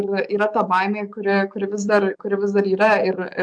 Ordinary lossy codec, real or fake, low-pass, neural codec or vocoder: MP3, 64 kbps; fake; 9.9 kHz; codec, 44.1 kHz, 7.8 kbps, DAC